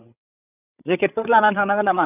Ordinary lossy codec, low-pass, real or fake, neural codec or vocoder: none; 3.6 kHz; fake; codec, 16 kHz, 8 kbps, FreqCodec, larger model